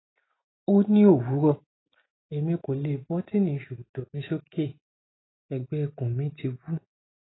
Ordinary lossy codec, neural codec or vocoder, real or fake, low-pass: AAC, 16 kbps; none; real; 7.2 kHz